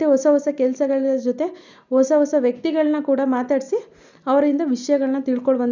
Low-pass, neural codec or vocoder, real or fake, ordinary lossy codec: 7.2 kHz; none; real; none